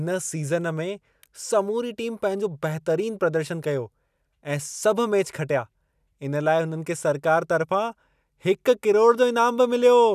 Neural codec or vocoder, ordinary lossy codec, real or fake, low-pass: none; none; real; 14.4 kHz